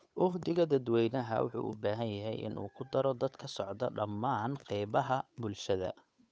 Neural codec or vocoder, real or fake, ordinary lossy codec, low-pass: codec, 16 kHz, 8 kbps, FunCodec, trained on Chinese and English, 25 frames a second; fake; none; none